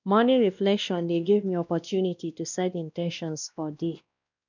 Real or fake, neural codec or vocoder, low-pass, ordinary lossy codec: fake; codec, 16 kHz, 1 kbps, X-Codec, WavLM features, trained on Multilingual LibriSpeech; 7.2 kHz; none